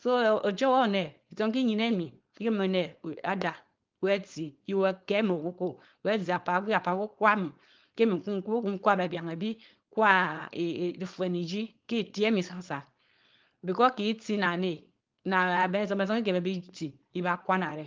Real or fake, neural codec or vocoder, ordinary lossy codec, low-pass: fake; codec, 16 kHz, 4.8 kbps, FACodec; Opus, 32 kbps; 7.2 kHz